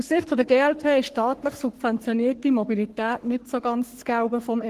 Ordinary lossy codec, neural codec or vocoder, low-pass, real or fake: Opus, 16 kbps; codec, 44.1 kHz, 3.4 kbps, Pupu-Codec; 14.4 kHz; fake